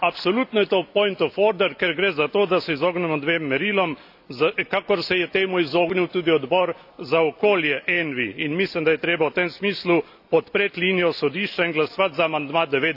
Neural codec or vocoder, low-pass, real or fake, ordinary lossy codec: none; 5.4 kHz; real; AAC, 48 kbps